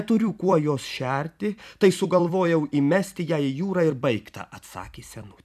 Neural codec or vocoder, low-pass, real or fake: vocoder, 44.1 kHz, 128 mel bands every 256 samples, BigVGAN v2; 14.4 kHz; fake